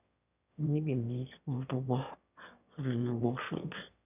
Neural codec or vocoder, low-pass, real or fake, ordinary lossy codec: autoencoder, 22.05 kHz, a latent of 192 numbers a frame, VITS, trained on one speaker; 3.6 kHz; fake; none